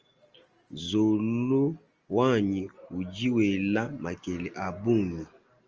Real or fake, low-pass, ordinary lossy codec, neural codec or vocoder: real; 7.2 kHz; Opus, 24 kbps; none